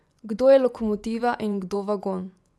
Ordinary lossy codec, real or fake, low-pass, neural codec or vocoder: none; fake; none; vocoder, 24 kHz, 100 mel bands, Vocos